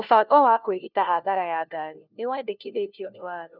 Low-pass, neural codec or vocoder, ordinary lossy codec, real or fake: 5.4 kHz; codec, 16 kHz, 1 kbps, FunCodec, trained on LibriTTS, 50 frames a second; none; fake